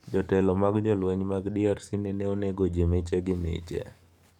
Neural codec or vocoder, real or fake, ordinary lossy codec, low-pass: codec, 44.1 kHz, 7.8 kbps, DAC; fake; none; 19.8 kHz